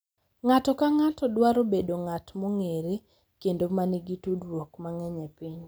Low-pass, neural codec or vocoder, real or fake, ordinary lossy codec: none; none; real; none